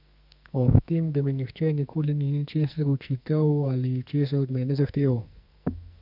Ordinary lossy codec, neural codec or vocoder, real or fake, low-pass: none; codec, 44.1 kHz, 2.6 kbps, SNAC; fake; 5.4 kHz